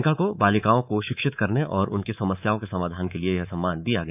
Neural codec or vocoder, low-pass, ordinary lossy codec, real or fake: codec, 24 kHz, 3.1 kbps, DualCodec; 3.6 kHz; none; fake